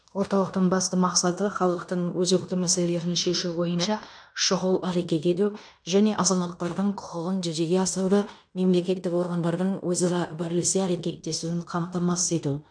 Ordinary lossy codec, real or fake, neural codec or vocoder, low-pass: none; fake; codec, 16 kHz in and 24 kHz out, 0.9 kbps, LongCat-Audio-Codec, fine tuned four codebook decoder; 9.9 kHz